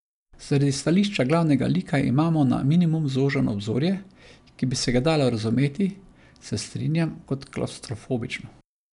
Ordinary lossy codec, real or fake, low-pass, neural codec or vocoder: none; real; 10.8 kHz; none